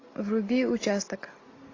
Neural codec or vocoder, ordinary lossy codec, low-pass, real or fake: none; AAC, 32 kbps; 7.2 kHz; real